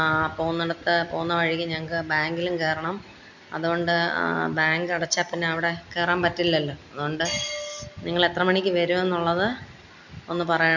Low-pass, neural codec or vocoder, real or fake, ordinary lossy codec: 7.2 kHz; none; real; none